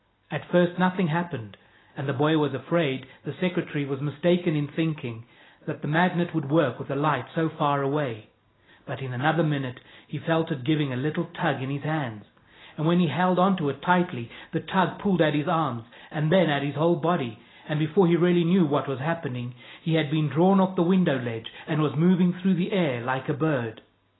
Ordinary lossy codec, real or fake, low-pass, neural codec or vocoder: AAC, 16 kbps; real; 7.2 kHz; none